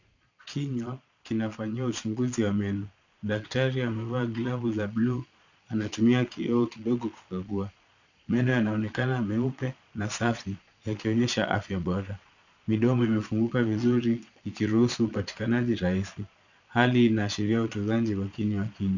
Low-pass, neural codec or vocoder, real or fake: 7.2 kHz; vocoder, 22.05 kHz, 80 mel bands, Vocos; fake